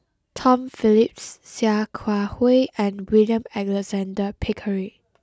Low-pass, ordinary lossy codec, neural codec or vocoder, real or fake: none; none; none; real